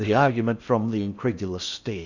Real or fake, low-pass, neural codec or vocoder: fake; 7.2 kHz; codec, 16 kHz in and 24 kHz out, 0.6 kbps, FocalCodec, streaming, 4096 codes